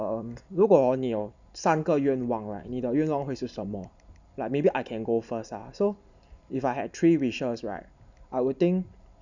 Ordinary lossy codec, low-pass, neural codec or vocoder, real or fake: none; 7.2 kHz; none; real